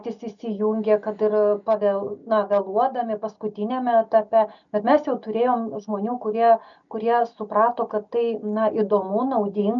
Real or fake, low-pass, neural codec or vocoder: real; 7.2 kHz; none